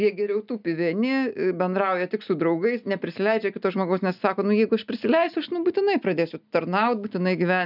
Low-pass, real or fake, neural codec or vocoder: 5.4 kHz; real; none